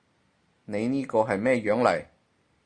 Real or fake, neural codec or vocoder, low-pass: real; none; 9.9 kHz